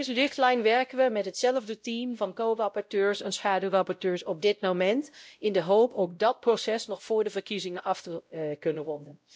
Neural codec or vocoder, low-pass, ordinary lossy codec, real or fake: codec, 16 kHz, 0.5 kbps, X-Codec, WavLM features, trained on Multilingual LibriSpeech; none; none; fake